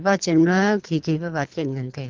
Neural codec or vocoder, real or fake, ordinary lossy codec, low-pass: codec, 24 kHz, 3 kbps, HILCodec; fake; Opus, 32 kbps; 7.2 kHz